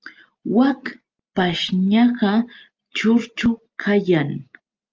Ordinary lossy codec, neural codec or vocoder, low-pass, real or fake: Opus, 24 kbps; none; 7.2 kHz; real